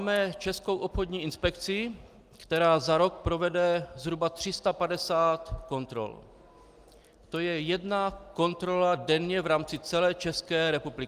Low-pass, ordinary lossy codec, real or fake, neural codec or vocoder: 14.4 kHz; Opus, 32 kbps; real; none